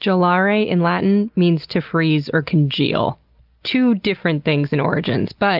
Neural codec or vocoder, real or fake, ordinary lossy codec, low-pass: none; real; Opus, 32 kbps; 5.4 kHz